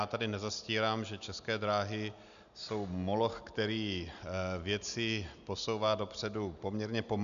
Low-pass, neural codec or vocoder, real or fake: 7.2 kHz; none; real